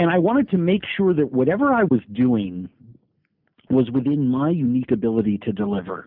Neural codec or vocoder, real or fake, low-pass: none; real; 5.4 kHz